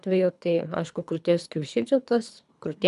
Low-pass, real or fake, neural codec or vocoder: 10.8 kHz; fake; codec, 24 kHz, 3 kbps, HILCodec